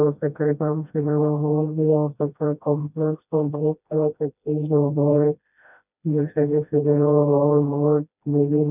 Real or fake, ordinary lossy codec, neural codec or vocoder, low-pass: fake; none; codec, 16 kHz, 1 kbps, FreqCodec, smaller model; 3.6 kHz